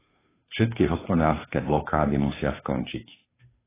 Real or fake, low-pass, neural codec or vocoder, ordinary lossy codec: fake; 3.6 kHz; codec, 16 kHz, 2 kbps, FunCodec, trained on Chinese and English, 25 frames a second; AAC, 16 kbps